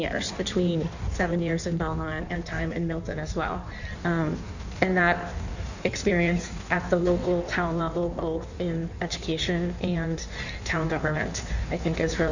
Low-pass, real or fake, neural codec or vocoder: 7.2 kHz; fake; codec, 16 kHz in and 24 kHz out, 1.1 kbps, FireRedTTS-2 codec